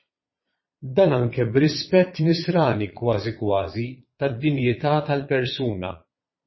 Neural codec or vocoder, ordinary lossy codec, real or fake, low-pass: vocoder, 22.05 kHz, 80 mel bands, Vocos; MP3, 24 kbps; fake; 7.2 kHz